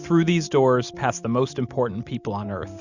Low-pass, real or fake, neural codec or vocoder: 7.2 kHz; real; none